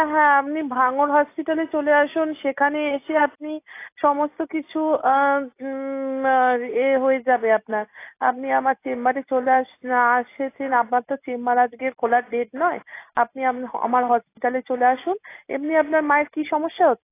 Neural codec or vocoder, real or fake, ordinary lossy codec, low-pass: none; real; AAC, 24 kbps; 3.6 kHz